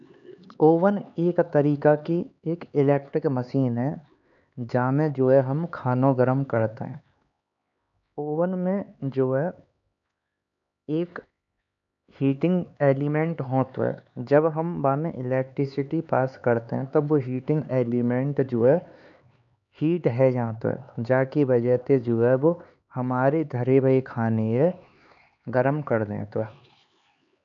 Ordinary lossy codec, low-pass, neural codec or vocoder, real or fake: none; 7.2 kHz; codec, 16 kHz, 4 kbps, X-Codec, HuBERT features, trained on LibriSpeech; fake